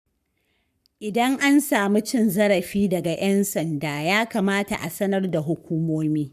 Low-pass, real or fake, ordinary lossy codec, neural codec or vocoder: 14.4 kHz; fake; none; codec, 44.1 kHz, 7.8 kbps, Pupu-Codec